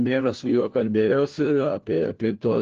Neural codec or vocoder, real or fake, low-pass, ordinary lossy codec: codec, 16 kHz, 1 kbps, FunCodec, trained on LibriTTS, 50 frames a second; fake; 7.2 kHz; Opus, 16 kbps